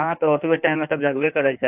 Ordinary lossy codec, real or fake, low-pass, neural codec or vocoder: AAC, 32 kbps; fake; 3.6 kHz; codec, 16 kHz in and 24 kHz out, 1.1 kbps, FireRedTTS-2 codec